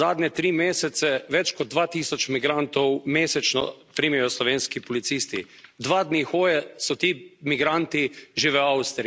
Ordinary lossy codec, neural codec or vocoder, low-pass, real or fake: none; none; none; real